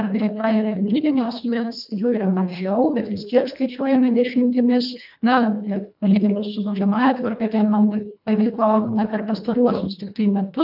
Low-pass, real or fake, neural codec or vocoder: 5.4 kHz; fake; codec, 24 kHz, 1.5 kbps, HILCodec